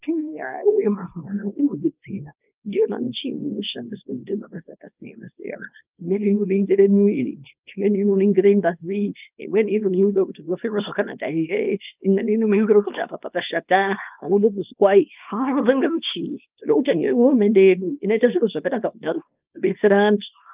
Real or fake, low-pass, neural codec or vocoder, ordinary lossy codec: fake; 3.6 kHz; codec, 24 kHz, 0.9 kbps, WavTokenizer, small release; Opus, 24 kbps